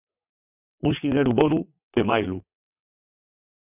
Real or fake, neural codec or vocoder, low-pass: fake; vocoder, 22.05 kHz, 80 mel bands, WaveNeXt; 3.6 kHz